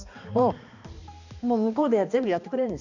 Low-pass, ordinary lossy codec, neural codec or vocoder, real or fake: 7.2 kHz; none; codec, 16 kHz, 2 kbps, X-Codec, HuBERT features, trained on balanced general audio; fake